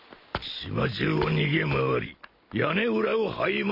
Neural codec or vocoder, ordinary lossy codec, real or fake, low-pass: none; MP3, 48 kbps; real; 5.4 kHz